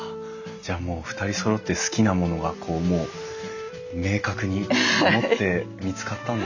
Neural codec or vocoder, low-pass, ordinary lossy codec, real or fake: none; 7.2 kHz; none; real